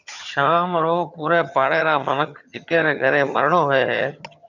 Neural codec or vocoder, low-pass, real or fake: vocoder, 22.05 kHz, 80 mel bands, HiFi-GAN; 7.2 kHz; fake